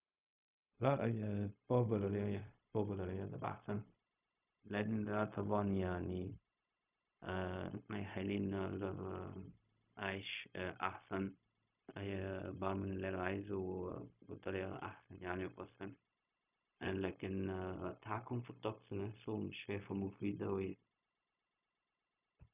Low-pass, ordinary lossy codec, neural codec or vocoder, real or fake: 3.6 kHz; none; codec, 16 kHz, 0.4 kbps, LongCat-Audio-Codec; fake